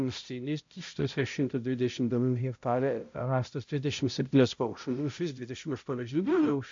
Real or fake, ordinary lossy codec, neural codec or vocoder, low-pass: fake; MP3, 48 kbps; codec, 16 kHz, 0.5 kbps, X-Codec, HuBERT features, trained on balanced general audio; 7.2 kHz